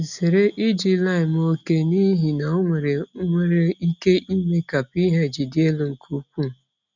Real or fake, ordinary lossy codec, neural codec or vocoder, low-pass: real; none; none; 7.2 kHz